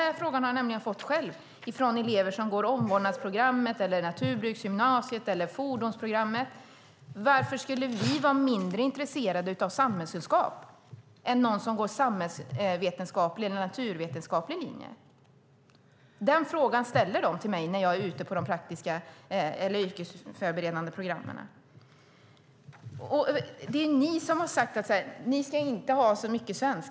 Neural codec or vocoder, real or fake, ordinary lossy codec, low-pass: none; real; none; none